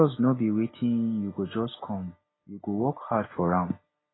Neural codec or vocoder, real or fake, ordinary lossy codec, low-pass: none; real; AAC, 16 kbps; 7.2 kHz